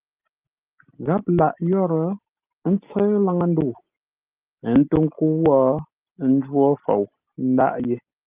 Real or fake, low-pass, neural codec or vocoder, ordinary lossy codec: real; 3.6 kHz; none; Opus, 32 kbps